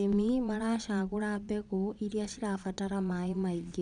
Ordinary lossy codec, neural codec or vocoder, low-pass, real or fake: none; vocoder, 22.05 kHz, 80 mel bands, Vocos; 9.9 kHz; fake